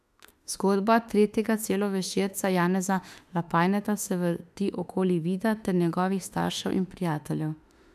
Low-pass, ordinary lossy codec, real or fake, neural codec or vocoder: 14.4 kHz; none; fake; autoencoder, 48 kHz, 32 numbers a frame, DAC-VAE, trained on Japanese speech